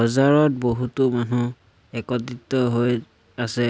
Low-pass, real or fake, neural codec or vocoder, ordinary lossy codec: none; real; none; none